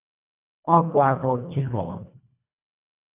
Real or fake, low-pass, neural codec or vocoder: fake; 3.6 kHz; codec, 24 kHz, 1.5 kbps, HILCodec